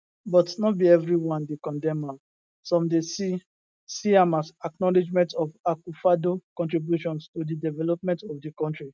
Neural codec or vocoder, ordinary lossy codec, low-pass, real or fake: none; none; none; real